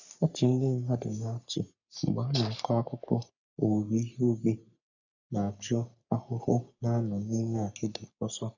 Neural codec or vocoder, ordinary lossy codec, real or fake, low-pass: codec, 44.1 kHz, 3.4 kbps, Pupu-Codec; none; fake; 7.2 kHz